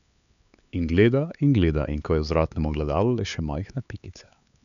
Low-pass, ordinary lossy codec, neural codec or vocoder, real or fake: 7.2 kHz; none; codec, 16 kHz, 4 kbps, X-Codec, HuBERT features, trained on LibriSpeech; fake